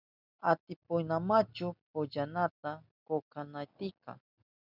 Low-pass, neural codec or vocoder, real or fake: 5.4 kHz; none; real